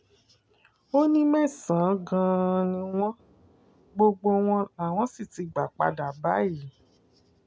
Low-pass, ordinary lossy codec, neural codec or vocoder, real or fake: none; none; none; real